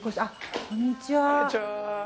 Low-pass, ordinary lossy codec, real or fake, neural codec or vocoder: none; none; real; none